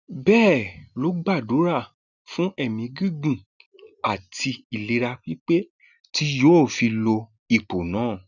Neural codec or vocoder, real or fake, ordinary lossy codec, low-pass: none; real; none; 7.2 kHz